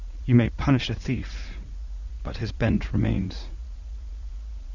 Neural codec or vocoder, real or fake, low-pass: vocoder, 44.1 kHz, 80 mel bands, Vocos; fake; 7.2 kHz